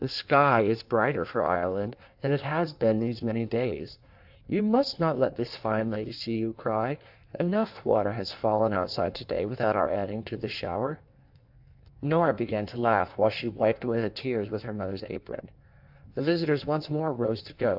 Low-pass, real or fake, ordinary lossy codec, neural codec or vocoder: 5.4 kHz; fake; AAC, 48 kbps; codec, 16 kHz in and 24 kHz out, 1.1 kbps, FireRedTTS-2 codec